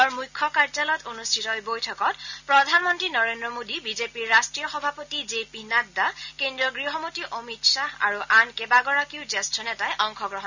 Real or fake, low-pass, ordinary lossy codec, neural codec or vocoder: real; 7.2 kHz; none; none